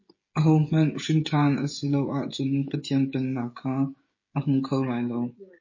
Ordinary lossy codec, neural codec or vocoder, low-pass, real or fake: MP3, 32 kbps; codec, 16 kHz, 16 kbps, FreqCodec, smaller model; 7.2 kHz; fake